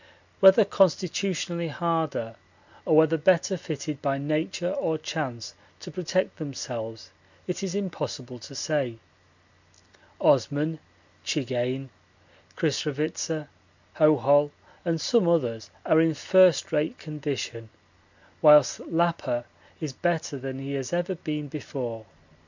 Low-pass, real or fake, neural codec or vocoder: 7.2 kHz; real; none